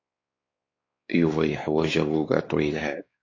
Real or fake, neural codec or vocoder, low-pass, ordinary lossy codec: fake; codec, 16 kHz, 4 kbps, X-Codec, WavLM features, trained on Multilingual LibriSpeech; 7.2 kHz; AAC, 32 kbps